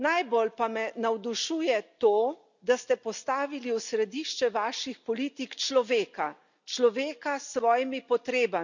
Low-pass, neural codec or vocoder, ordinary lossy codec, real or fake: 7.2 kHz; none; none; real